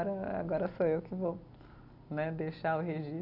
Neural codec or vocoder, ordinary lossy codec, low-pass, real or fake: none; none; 5.4 kHz; real